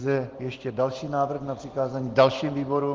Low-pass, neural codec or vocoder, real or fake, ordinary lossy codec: 7.2 kHz; none; real; Opus, 16 kbps